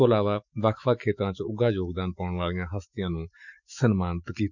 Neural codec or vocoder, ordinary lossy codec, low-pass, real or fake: autoencoder, 48 kHz, 128 numbers a frame, DAC-VAE, trained on Japanese speech; none; 7.2 kHz; fake